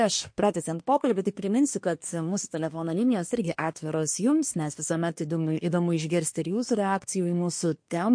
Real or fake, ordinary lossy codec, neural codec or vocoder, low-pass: fake; MP3, 48 kbps; codec, 24 kHz, 1 kbps, SNAC; 9.9 kHz